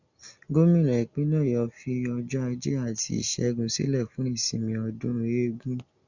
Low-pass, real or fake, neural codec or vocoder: 7.2 kHz; real; none